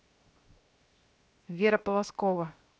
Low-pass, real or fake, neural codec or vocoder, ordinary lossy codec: none; fake; codec, 16 kHz, 0.7 kbps, FocalCodec; none